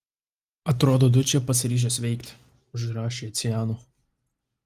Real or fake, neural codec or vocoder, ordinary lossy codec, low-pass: real; none; Opus, 32 kbps; 14.4 kHz